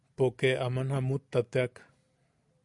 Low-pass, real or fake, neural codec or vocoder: 10.8 kHz; real; none